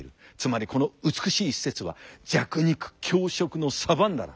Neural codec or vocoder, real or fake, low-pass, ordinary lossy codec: none; real; none; none